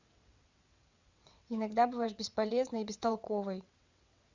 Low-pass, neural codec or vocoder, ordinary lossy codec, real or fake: 7.2 kHz; vocoder, 22.05 kHz, 80 mel bands, WaveNeXt; Opus, 64 kbps; fake